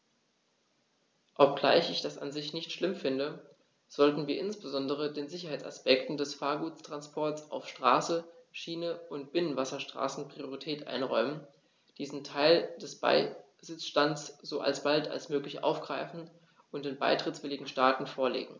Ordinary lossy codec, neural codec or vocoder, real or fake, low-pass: none; none; real; none